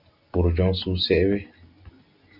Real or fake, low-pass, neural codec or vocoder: real; 5.4 kHz; none